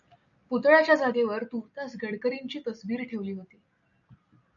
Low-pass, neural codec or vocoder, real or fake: 7.2 kHz; none; real